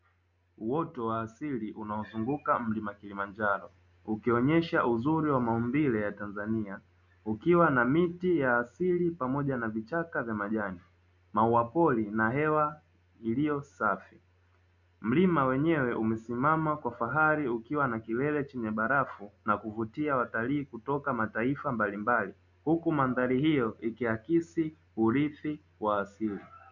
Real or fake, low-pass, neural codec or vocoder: real; 7.2 kHz; none